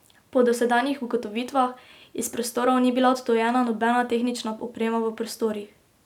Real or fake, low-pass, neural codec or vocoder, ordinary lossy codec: real; 19.8 kHz; none; none